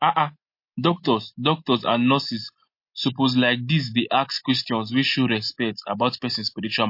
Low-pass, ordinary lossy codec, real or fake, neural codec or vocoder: 5.4 kHz; MP3, 32 kbps; real; none